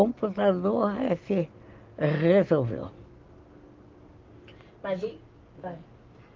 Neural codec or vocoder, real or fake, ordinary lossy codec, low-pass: none; real; Opus, 32 kbps; 7.2 kHz